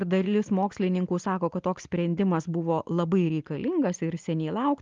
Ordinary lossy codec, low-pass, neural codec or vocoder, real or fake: Opus, 32 kbps; 7.2 kHz; none; real